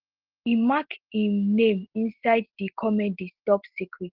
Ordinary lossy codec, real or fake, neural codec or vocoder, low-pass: Opus, 16 kbps; real; none; 5.4 kHz